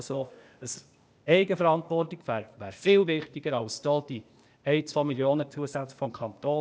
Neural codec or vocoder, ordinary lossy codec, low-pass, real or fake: codec, 16 kHz, 0.8 kbps, ZipCodec; none; none; fake